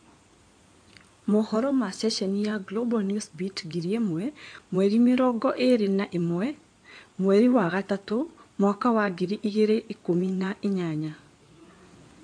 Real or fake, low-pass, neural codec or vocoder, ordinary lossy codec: fake; 9.9 kHz; codec, 16 kHz in and 24 kHz out, 2.2 kbps, FireRedTTS-2 codec; AAC, 64 kbps